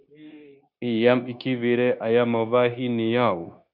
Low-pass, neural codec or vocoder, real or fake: 5.4 kHz; codec, 16 kHz, 0.9 kbps, LongCat-Audio-Codec; fake